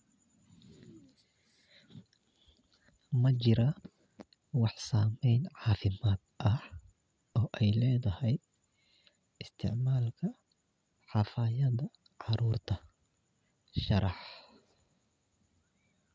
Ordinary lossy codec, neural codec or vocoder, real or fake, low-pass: none; none; real; none